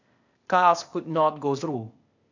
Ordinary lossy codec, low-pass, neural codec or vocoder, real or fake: none; 7.2 kHz; codec, 16 kHz, 0.8 kbps, ZipCodec; fake